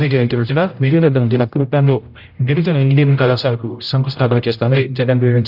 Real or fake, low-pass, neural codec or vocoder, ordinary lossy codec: fake; 5.4 kHz; codec, 16 kHz, 0.5 kbps, X-Codec, HuBERT features, trained on general audio; none